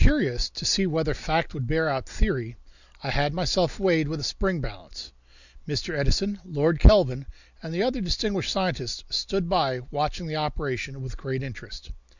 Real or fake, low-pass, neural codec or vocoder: real; 7.2 kHz; none